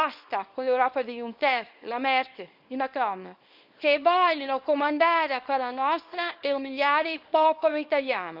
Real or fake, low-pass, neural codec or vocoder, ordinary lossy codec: fake; 5.4 kHz; codec, 24 kHz, 0.9 kbps, WavTokenizer, small release; Opus, 64 kbps